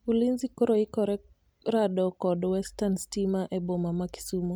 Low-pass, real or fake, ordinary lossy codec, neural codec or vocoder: none; real; none; none